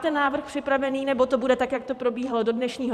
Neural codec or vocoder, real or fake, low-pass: vocoder, 44.1 kHz, 128 mel bands every 512 samples, BigVGAN v2; fake; 14.4 kHz